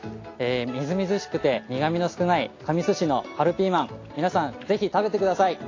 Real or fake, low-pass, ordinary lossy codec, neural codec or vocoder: real; 7.2 kHz; AAC, 32 kbps; none